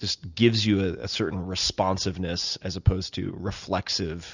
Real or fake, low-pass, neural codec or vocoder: real; 7.2 kHz; none